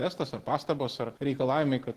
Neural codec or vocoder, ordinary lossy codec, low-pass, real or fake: none; Opus, 16 kbps; 14.4 kHz; real